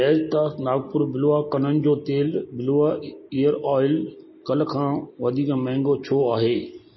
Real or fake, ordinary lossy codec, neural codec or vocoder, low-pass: real; MP3, 24 kbps; none; 7.2 kHz